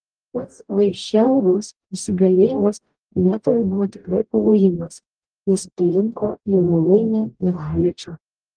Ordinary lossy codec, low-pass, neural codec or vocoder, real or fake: Opus, 32 kbps; 9.9 kHz; codec, 44.1 kHz, 0.9 kbps, DAC; fake